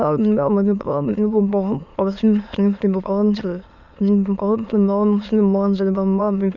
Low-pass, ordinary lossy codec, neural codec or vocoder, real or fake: 7.2 kHz; none; autoencoder, 22.05 kHz, a latent of 192 numbers a frame, VITS, trained on many speakers; fake